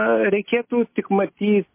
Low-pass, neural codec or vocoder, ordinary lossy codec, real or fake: 3.6 kHz; none; MP3, 24 kbps; real